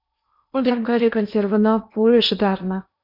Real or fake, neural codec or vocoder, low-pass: fake; codec, 16 kHz in and 24 kHz out, 0.8 kbps, FocalCodec, streaming, 65536 codes; 5.4 kHz